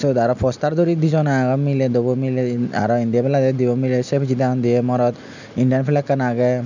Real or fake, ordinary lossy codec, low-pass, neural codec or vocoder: real; none; 7.2 kHz; none